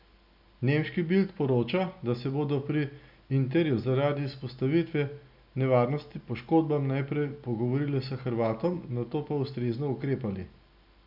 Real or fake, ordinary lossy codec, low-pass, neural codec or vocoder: real; none; 5.4 kHz; none